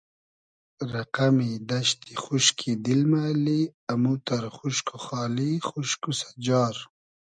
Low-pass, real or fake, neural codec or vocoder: 9.9 kHz; real; none